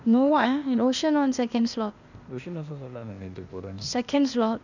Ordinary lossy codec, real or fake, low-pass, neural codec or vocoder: none; fake; 7.2 kHz; codec, 16 kHz, 0.8 kbps, ZipCodec